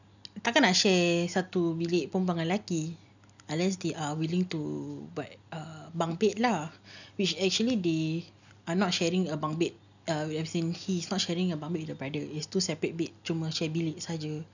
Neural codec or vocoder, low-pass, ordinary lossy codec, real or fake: none; 7.2 kHz; none; real